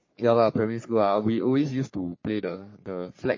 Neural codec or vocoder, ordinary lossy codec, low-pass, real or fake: codec, 44.1 kHz, 3.4 kbps, Pupu-Codec; MP3, 32 kbps; 7.2 kHz; fake